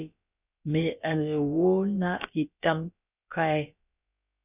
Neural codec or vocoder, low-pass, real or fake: codec, 16 kHz, about 1 kbps, DyCAST, with the encoder's durations; 3.6 kHz; fake